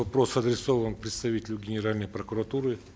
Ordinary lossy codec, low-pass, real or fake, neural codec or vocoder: none; none; real; none